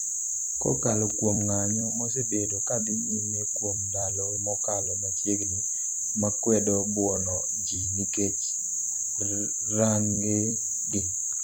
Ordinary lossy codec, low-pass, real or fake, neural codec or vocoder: none; none; fake; vocoder, 44.1 kHz, 128 mel bands every 256 samples, BigVGAN v2